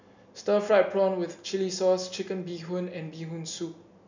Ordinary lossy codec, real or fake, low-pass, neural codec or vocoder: none; real; 7.2 kHz; none